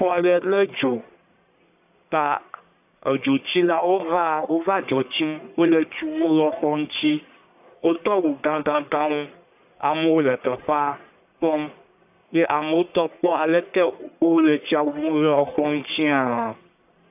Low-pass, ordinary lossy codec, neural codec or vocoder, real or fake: 3.6 kHz; AAC, 32 kbps; codec, 44.1 kHz, 1.7 kbps, Pupu-Codec; fake